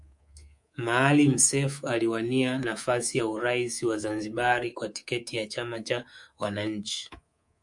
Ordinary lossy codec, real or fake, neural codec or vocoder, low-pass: MP3, 64 kbps; fake; codec, 24 kHz, 3.1 kbps, DualCodec; 10.8 kHz